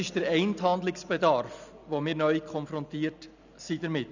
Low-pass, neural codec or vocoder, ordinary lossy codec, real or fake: 7.2 kHz; none; none; real